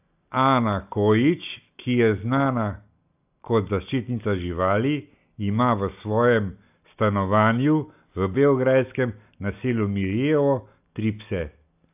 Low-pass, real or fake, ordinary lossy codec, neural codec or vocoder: 3.6 kHz; real; AAC, 32 kbps; none